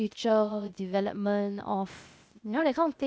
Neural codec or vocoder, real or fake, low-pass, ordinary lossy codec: codec, 16 kHz, 0.8 kbps, ZipCodec; fake; none; none